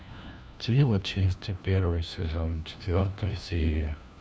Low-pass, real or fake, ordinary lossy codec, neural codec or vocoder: none; fake; none; codec, 16 kHz, 1 kbps, FunCodec, trained on LibriTTS, 50 frames a second